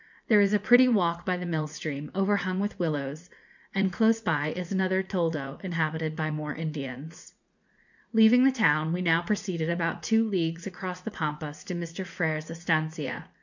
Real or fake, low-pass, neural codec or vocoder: fake; 7.2 kHz; vocoder, 44.1 kHz, 80 mel bands, Vocos